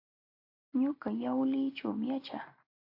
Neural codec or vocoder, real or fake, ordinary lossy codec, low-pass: none; real; AAC, 32 kbps; 5.4 kHz